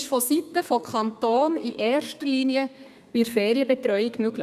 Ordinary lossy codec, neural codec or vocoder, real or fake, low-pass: none; codec, 32 kHz, 1.9 kbps, SNAC; fake; 14.4 kHz